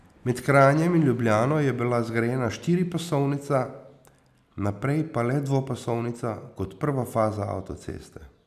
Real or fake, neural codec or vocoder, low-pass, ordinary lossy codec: real; none; 14.4 kHz; none